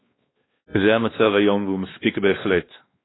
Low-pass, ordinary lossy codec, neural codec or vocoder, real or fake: 7.2 kHz; AAC, 16 kbps; codec, 16 kHz, 2 kbps, X-Codec, WavLM features, trained on Multilingual LibriSpeech; fake